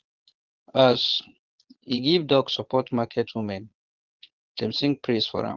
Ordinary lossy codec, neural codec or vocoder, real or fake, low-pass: Opus, 16 kbps; vocoder, 22.05 kHz, 80 mel bands, Vocos; fake; 7.2 kHz